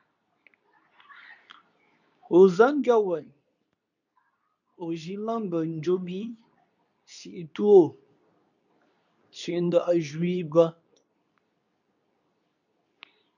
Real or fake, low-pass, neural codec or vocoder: fake; 7.2 kHz; codec, 24 kHz, 0.9 kbps, WavTokenizer, medium speech release version 2